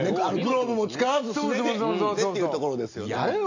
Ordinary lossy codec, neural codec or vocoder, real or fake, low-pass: AAC, 48 kbps; none; real; 7.2 kHz